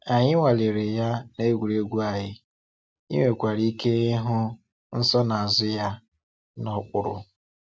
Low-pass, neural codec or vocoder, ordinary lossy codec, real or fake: none; none; none; real